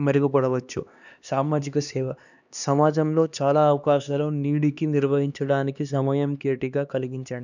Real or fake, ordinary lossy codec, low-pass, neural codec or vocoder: fake; none; 7.2 kHz; codec, 16 kHz, 2 kbps, X-Codec, HuBERT features, trained on LibriSpeech